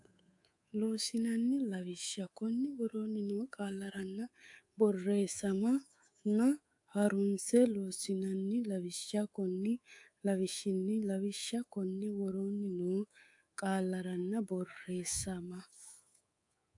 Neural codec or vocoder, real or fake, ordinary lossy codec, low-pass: codec, 24 kHz, 3.1 kbps, DualCodec; fake; AAC, 64 kbps; 10.8 kHz